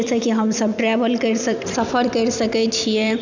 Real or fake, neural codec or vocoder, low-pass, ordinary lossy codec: fake; vocoder, 44.1 kHz, 128 mel bands every 256 samples, BigVGAN v2; 7.2 kHz; none